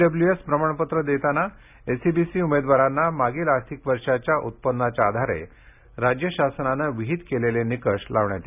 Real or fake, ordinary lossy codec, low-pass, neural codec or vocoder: real; none; 3.6 kHz; none